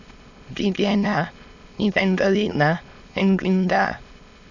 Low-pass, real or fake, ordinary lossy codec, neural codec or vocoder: 7.2 kHz; fake; none; autoencoder, 22.05 kHz, a latent of 192 numbers a frame, VITS, trained on many speakers